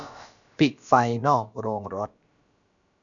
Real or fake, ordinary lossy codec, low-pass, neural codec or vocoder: fake; none; 7.2 kHz; codec, 16 kHz, about 1 kbps, DyCAST, with the encoder's durations